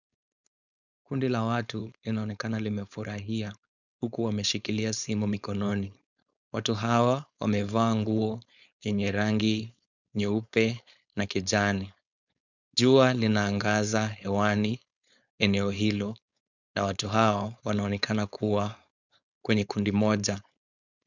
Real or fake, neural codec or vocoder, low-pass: fake; codec, 16 kHz, 4.8 kbps, FACodec; 7.2 kHz